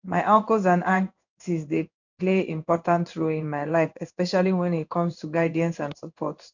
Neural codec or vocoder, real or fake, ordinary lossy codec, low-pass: codec, 16 kHz in and 24 kHz out, 1 kbps, XY-Tokenizer; fake; none; 7.2 kHz